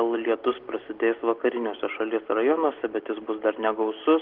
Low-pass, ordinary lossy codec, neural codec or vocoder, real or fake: 5.4 kHz; Opus, 16 kbps; none; real